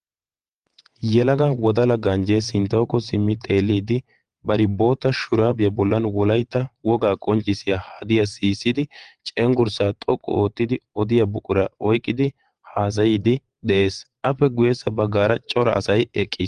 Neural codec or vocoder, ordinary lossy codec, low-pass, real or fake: vocoder, 22.05 kHz, 80 mel bands, WaveNeXt; Opus, 24 kbps; 9.9 kHz; fake